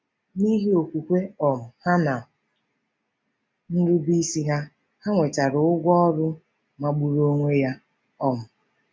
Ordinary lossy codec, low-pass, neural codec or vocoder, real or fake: none; none; none; real